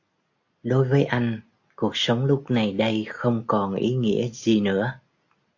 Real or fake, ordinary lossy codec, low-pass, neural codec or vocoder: real; AAC, 48 kbps; 7.2 kHz; none